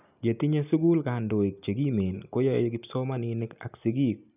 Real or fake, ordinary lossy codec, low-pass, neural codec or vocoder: real; none; 3.6 kHz; none